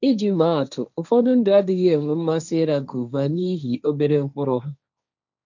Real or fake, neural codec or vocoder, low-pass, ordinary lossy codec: fake; codec, 16 kHz, 1.1 kbps, Voila-Tokenizer; 7.2 kHz; none